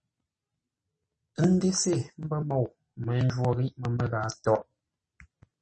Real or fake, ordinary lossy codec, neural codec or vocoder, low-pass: real; MP3, 32 kbps; none; 10.8 kHz